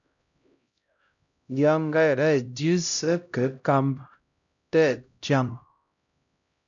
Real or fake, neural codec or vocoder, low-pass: fake; codec, 16 kHz, 0.5 kbps, X-Codec, HuBERT features, trained on LibriSpeech; 7.2 kHz